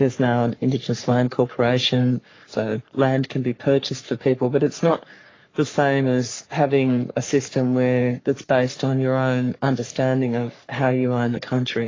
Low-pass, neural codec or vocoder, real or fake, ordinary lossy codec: 7.2 kHz; codec, 44.1 kHz, 3.4 kbps, Pupu-Codec; fake; AAC, 32 kbps